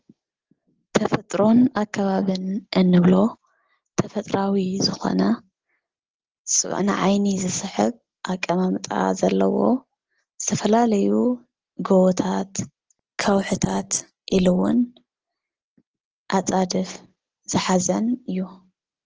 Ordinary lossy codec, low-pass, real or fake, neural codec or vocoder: Opus, 16 kbps; 7.2 kHz; real; none